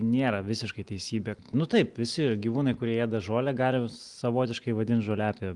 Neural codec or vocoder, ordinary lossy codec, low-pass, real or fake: none; Opus, 24 kbps; 10.8 kHz; real